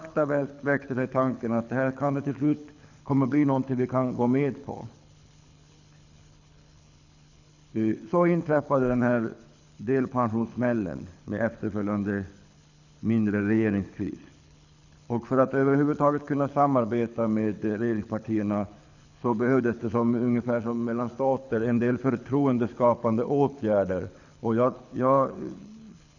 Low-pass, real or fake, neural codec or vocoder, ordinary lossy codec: 7.2 kHz; fake; codec, 24 kHz, 6 kbps, HILCodec; none